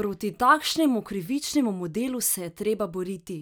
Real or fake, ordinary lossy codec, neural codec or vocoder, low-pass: real; none; none; none